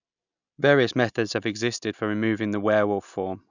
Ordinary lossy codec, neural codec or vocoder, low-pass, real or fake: none; none; 7.2 kHz; real